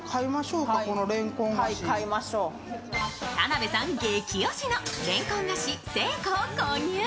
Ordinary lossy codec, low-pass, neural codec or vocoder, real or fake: none; none; none; real